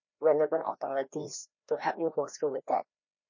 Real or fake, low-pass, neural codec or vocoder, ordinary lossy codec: fake; 7.2 kHz; codec, 16 kHz, 1 kbps, FreqCodec, larger model; MP3, 32 kbps